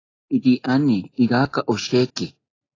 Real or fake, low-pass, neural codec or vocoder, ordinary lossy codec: real; 7.2 kHz; none; AAC, 32 kbps